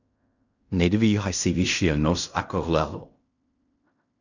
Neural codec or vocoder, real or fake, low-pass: codec, 16 kHz in and 24 kHz out, 0.4 kbps, LongCat-Audio-Codec, fine tuned four codebook decoder; fake; 7.2 kHz